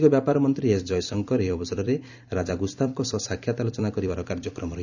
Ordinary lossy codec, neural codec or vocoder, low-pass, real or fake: none; vocoder, 44.1 kHz, 128 mel bands every 256 samples, BigVGAN v2; 7.2 kHz; fake